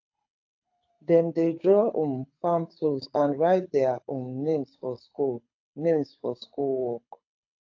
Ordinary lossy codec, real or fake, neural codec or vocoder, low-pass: AAC, 48 kbps; fake; codec, 24 kHz, 6 kbps, HILCodec; 7.2 kHz